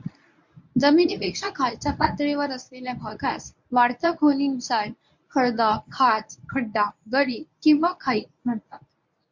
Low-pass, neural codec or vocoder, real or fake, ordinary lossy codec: 7.2 kHz; codec, 24 kHz, 0.9 kbps, WavTokenizer, medium speech release version 1; fake; MP3, 64 kbps